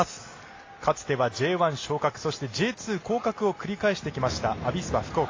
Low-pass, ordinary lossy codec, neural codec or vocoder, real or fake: 7.2 kHz; AAC, 32 kbps; none; real